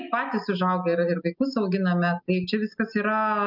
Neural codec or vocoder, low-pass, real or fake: none; 5.4 kHz; real